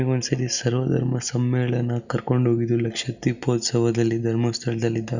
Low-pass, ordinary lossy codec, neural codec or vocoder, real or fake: 7.2 kHz; none; none; real